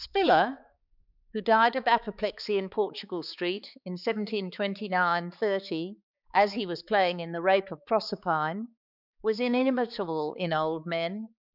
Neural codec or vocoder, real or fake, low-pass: codec, 16 kHz, 4 kbps, X-Codec, HuBERT features, trained on balanced general audio; fake; 5.4 kHz